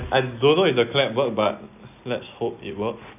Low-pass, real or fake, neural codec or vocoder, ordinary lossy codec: 3.6 kHz; real; none; none